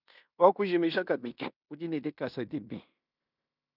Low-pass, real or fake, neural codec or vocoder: 5.4 kHz; fake; codec, 16 kHz in and 24 kHz out, 0.9 kbps, LongCat-Audio-Codec, fine tuned four codebook decoder